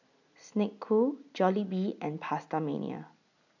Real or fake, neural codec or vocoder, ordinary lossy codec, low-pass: real; none; none; 7.2 kHz